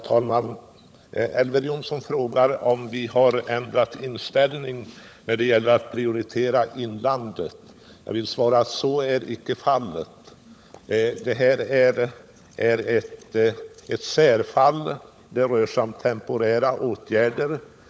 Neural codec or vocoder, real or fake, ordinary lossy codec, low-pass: codec, 16 kHz, 16 kbps, FunCodec, trained on LibriTTS, 50 frames a second; fake; none; none